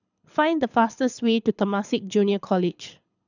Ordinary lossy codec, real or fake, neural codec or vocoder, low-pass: none; fake; codec, 24 kHz, 6 kbps, HILCodec; 7.2 kHz